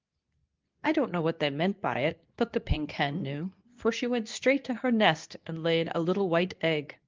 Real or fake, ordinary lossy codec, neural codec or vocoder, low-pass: fake; Opus, 24 kbps; codec, 24 kHz, 0.9 kbps, WavTokenizer, medium speech release version 2; 7.2 kHz